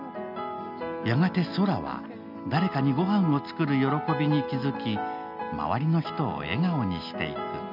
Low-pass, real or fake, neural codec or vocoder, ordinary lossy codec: 5.4 kHz; real; none; none